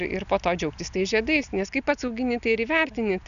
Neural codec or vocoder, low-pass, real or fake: none; 7.2 kHz; real